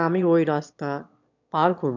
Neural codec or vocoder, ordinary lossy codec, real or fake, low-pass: autoencoder, 22.05 kHz, a latent of 192 numbers a frame, VITS, trained on one speaker; none; fake; 7.2 kHz